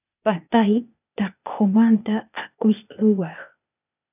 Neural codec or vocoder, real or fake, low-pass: codec, 16 kHz, 0.8 kbps, ZipCodec; fake; 3.6 kHz